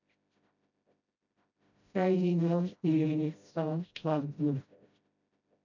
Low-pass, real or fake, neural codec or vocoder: 7.2 kHz; fake; codec, 16 kHz, 0.5 kbps, FreqCodec, smaller model